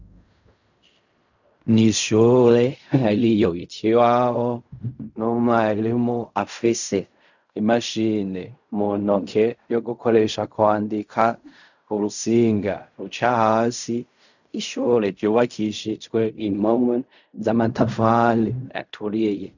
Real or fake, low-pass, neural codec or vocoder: fake; 7.2 kHz; codec, 16 kHz in and 24 kHz out, 0.4 kbps, LongCat-Audio-Codec, fine tuned four codebook decoder